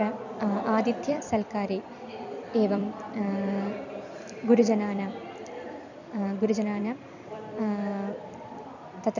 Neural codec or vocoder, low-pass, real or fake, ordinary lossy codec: vocoder, 44.1 kHz, 128 mel bands every 256 samples, BigVGAN v2; 7.2 kHz; fake; none